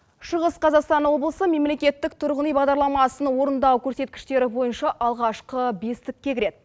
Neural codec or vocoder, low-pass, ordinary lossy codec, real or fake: none; none; none; real